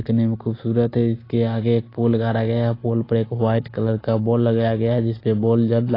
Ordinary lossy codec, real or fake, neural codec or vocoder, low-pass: AAC, 24 kbps; real; none; 5.4 kHz